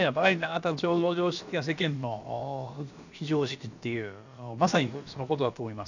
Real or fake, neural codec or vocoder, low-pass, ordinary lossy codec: fake; codec, 16 kHz, about 1 kbps, DyCAST, with the encoder's durations; 7.2 kHz; none